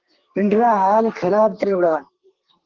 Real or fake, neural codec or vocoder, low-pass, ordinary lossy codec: fake; codec, 32 kHz, 1.9 kbps, SNAC; 7.2 kHz; Opus, 16 kbps